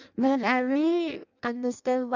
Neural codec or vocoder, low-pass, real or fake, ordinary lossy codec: codec, 16 kHz in and 24 kHz out, 0.6 kbps, FireRedTTS-2 codec; 7.2 kHz; fake; none